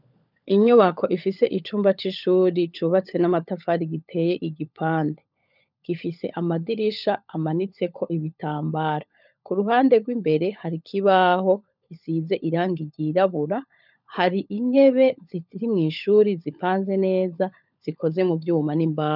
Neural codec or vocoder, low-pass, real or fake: codec, 16 kHz, 16 kbps, FunCodec, trained on LibriTTS, 50 frames a second; 5.4 kHz; fake